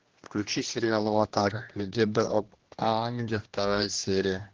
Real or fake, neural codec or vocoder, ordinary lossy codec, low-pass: fake; codec, 16 kHz, 1 kbps, X-Codec, HuBERT features, trained on general audio; Opus, 16 kbps; 7.2 kHz